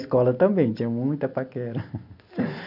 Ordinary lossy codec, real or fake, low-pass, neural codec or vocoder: none; real; 5.4 kHz; none